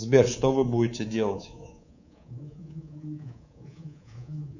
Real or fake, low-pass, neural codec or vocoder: fake; 7.2 kHz; codec, 24 kHz, 3.1 kbps, DualCodec